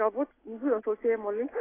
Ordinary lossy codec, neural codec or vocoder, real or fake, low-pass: AAC, 16 kbps; none; real; 3.6 kHz